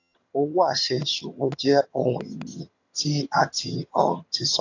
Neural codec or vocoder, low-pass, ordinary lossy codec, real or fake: vocoder, 22.05 kHz, 80 mel bands, HiFi-GAN; 7.2 kHz; AAC, 48 kbps; fake